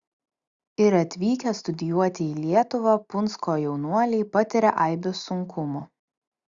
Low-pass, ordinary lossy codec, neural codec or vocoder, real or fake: 7.2 kHz; Opus, 64 kbps; none; real